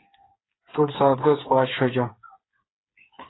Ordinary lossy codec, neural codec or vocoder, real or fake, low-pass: AAC, 16 kbps; codec, 16 kHz, 4 kbps, FreqCodec, smaller model; fake; 7.2 kHz